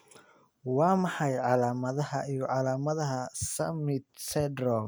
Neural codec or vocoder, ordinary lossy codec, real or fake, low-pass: none; none; real; none